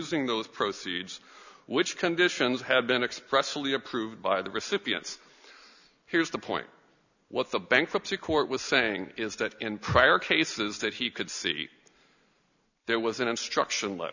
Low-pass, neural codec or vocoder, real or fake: 7.2 kHz; none; real